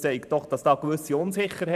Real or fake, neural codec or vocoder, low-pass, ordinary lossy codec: fake; vocoder, 44.1 kHz, 128 mel bands every 512 samples, BigVGAN v2; 14.4 kHz; none